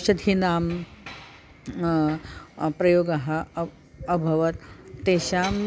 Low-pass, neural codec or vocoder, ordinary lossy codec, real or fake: none; none; none; real